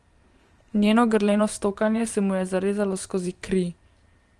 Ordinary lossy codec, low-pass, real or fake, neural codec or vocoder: Opus, 24 kbps; 10.8 kHz; fake; vocoder, 44.1 kHz, 128 mel bands every 512 samples, BigVGAN v2